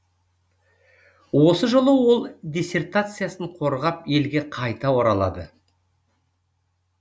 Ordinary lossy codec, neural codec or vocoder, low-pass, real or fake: none; none; none; real